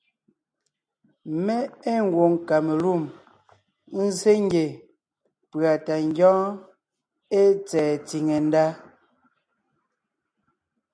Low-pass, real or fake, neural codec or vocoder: 9.9 kHz; real; none